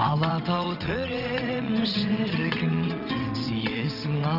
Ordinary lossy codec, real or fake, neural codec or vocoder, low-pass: none; fake; vocoder, 22.05 kHz, 80 mel bands, WaveNeXt; 5.4 kHz